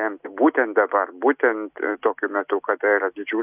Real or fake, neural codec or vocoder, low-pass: real; none; 3.6 kHz